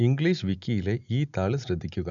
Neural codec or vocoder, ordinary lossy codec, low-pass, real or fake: none; none; 7.2 kHz; real